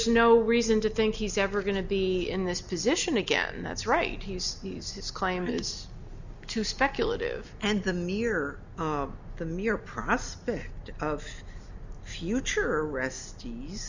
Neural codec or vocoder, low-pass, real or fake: none; 7.2 kHz; real